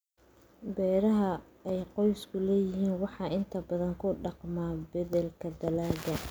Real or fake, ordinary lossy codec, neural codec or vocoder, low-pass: real; none; none; none